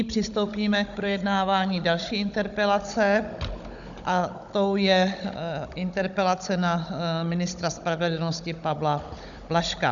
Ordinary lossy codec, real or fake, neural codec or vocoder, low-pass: MP3, 96 kbps; fake; codec, 16 kHz, 16 kbps, FunCodec, trained on Chinese and English, 50 frames a second; 7.2 kHz